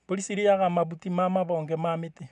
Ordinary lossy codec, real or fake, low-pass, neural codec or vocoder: none; real; 10.8 kHz; none